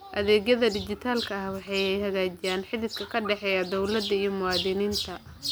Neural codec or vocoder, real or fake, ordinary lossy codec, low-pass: none; real; none; none